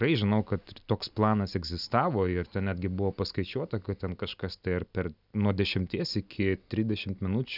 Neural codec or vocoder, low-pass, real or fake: none; 5.4 kHz; real